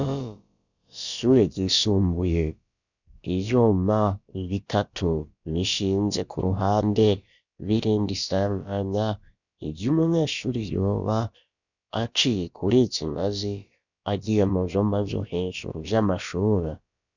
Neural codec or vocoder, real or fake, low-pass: codec, 16 kHz, about 1 kbps, DyCAST, with the encoder's durations; fake; 7.2 kHz